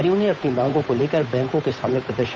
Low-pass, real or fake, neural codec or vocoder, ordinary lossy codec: none; fake; codec, 16 kHz, 8 kbps, FunCodec, trained on Chinese and English, 25 frames a second; none